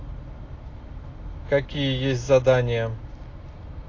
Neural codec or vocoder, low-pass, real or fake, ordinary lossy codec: none; 7.2 kHz; real; AAC, 32 kbps